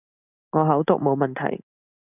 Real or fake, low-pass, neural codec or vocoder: real; 3.6 kHz; none